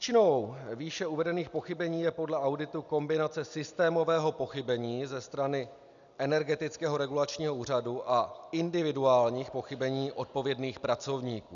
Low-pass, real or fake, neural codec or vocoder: 7.2 kHz; real; none